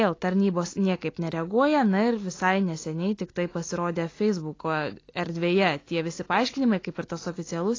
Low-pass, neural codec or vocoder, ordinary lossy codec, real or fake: 7.2 kHz; none; AAC, 32 kbps; real